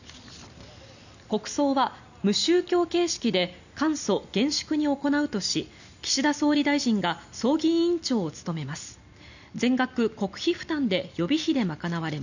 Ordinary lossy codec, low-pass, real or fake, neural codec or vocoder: none; 7.2 kHz; real; none